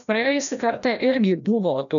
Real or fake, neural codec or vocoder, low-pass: fake; codec, 16 kHz, 1 kbps, FreqCodec, larger model; 7.2 kHz